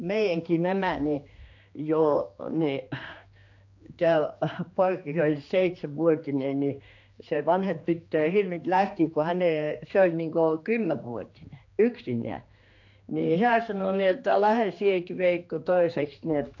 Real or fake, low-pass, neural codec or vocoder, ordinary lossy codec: fake; 7.2 kHz; codec, 16 kHz, 2 kbps, X-Codec, HuBERT features, trained on general audio; AAC, 48 kbps